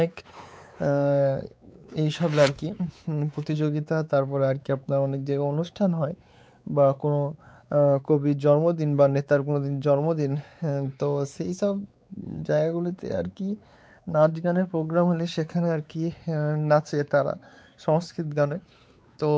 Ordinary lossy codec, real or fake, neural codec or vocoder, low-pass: none; fake; codec, 16 kHz, 4 kbps, X-Codec, WavLM features, trained on Multilingual LibriSpeech; none